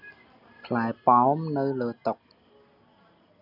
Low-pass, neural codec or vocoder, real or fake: 5.4 kHz; none; real